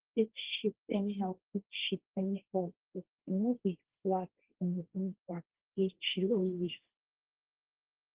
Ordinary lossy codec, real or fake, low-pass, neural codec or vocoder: Opus, 16 kbps; fake; 3.6 kHz; codec, 16 kHz, 1.1 kbps, Voila-Tokenizer